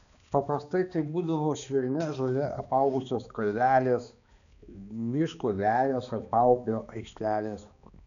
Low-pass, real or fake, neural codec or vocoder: 7.2 kHz; fake; codec, 16 kHz, 2 kbps, X-Codec, HuBERT features, trained on balanced general audio